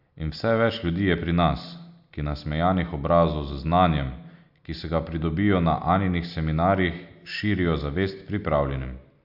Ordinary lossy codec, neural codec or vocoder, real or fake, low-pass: AAC, 48 kbps; none; real; 5.4 kHz